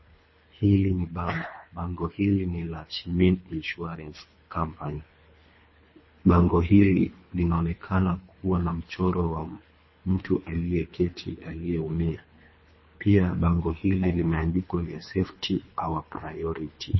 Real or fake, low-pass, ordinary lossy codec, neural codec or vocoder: fake; 7.2 kHz; MP3, 24 kbps; codec, 24 kHz, 3 kbps, HILCodec